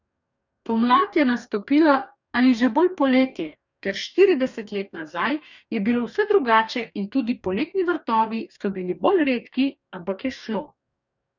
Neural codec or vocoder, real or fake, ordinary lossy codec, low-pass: codec, 44.1 kHz, 2.6 kbps, DAC; fake; none; 7.2 kHz